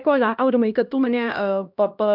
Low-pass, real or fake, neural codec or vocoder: 5.4 kHz; fake; codec, 16 kHz, 1 kbps, X-Codec, HuBERT features, trained on LibriSpeech